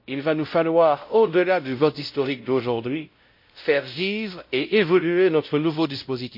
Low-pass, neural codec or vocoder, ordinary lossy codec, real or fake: 5.4 kHz; codec, 16 kHz, 0.5 kbps, X-Codec, WavLM features, trained on Multilingual LibriSpeech; MP3, 32 kbps; fake